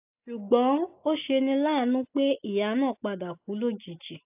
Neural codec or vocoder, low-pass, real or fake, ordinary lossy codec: none; 3.6 kHz; real; AAC, 32 kbps